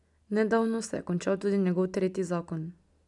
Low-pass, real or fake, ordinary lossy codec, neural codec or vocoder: 10.8 kHz; real; none; none